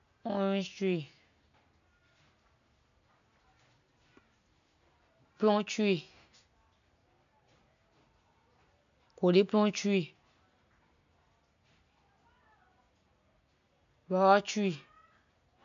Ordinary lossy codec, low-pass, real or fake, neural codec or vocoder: MP3, 96 kbps; 7.2 kHz; real; none